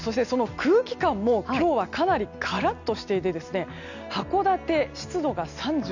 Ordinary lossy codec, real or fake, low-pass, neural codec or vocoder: none; real; 7.2 kHz; none